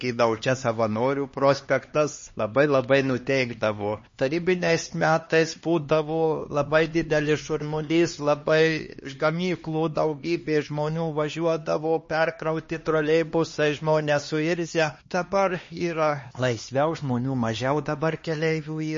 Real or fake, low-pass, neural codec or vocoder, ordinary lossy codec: fake; 7.2 kHz; codec, 16 kHz, 2 kbps, X-Codec, HuBERT features, trained on LibriSpeech; MP3, 32 kbps